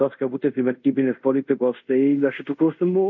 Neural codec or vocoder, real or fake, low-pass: codec, 24 kHz, 0.5 kbps, DualCodec; fake; 7.2 kHz